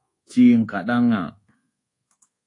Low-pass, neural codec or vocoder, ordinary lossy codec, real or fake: 10.8 kHz; codec, 24 kHz, 1.2 kbps, DualCodec; AAC, 48 kbps; fake